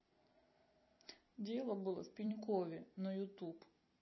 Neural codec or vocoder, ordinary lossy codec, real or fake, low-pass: vocoder, 44.1 kHz, 128 mel bands every 256 samples, BigVGAN v2; MP3, 24 kbps; fake; 7.2 kHz